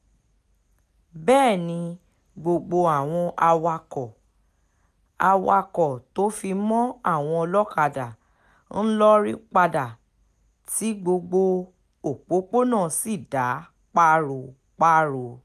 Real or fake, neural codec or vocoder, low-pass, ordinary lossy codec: real; none; 14.4 kHz; none